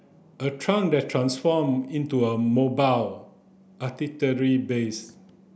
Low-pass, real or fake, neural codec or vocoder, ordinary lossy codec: none; real; none; none